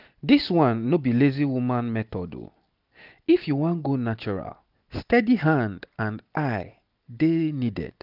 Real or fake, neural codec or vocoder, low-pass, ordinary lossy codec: real; none; 5.4 kHz; none